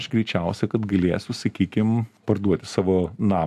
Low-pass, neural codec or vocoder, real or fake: 14.4 kHz; none; real